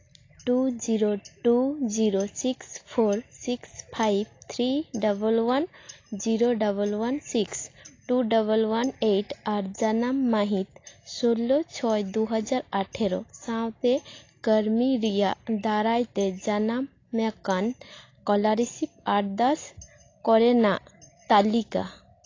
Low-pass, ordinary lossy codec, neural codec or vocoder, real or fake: 7.2 kHz; AAC, 32 kbps; none; real